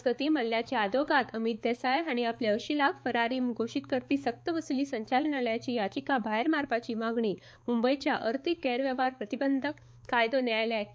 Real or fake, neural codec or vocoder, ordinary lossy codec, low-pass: fake; codec, 16 kHz, 4 kbps, X-Codec, HuBERT features, trained on balanced general audio; none; none